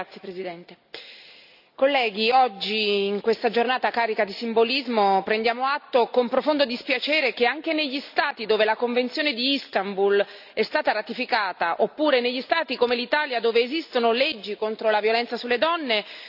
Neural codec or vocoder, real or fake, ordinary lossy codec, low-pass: none; real; none; 5.4 kHz